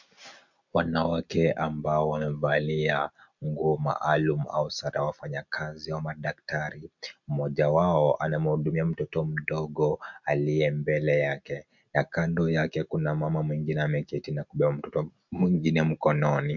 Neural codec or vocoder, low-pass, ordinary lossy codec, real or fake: none; 7.2 kHz; MP3, 64 kbps; real